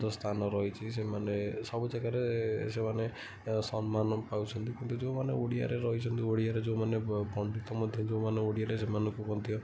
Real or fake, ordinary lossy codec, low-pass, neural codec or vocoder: real; none; none; none